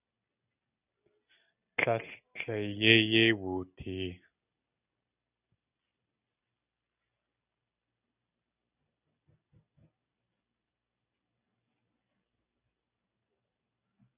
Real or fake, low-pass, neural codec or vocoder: real; 3.6 kHz; none